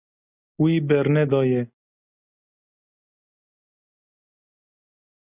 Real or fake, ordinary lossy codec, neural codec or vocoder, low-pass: real; Opus, 64 kbps; none; 3.6 kHz